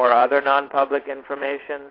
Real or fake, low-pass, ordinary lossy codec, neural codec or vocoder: fake; 5.4 kHz; AAC, 32 kbps; vocoder, 22.05 kHz, 80 mel bands, WaveNeXt